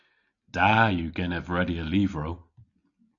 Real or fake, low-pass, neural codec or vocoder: real; 7.2 kHz; none